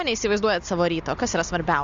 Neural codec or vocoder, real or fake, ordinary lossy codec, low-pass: none; real; Opus, 64 kbps; 7.2 kHz